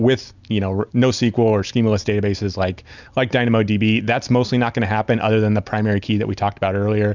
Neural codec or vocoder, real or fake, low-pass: none; real; 7.2 kHz